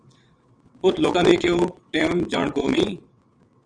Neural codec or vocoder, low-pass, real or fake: vocoder, 22.05 kHz, 80 mel bands, WaveNeXt; 9.9 kHz; fake